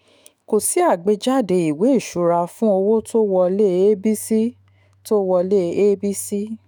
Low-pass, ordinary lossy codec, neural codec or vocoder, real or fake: none; none; autoencoder, 48 kHz, 128 numbers a frame, DAC-VAE, trained on Japanese speech; fake